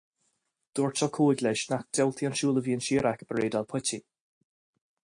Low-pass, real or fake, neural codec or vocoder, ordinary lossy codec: 9.9 kHz; real; none; AAC, 64 kbps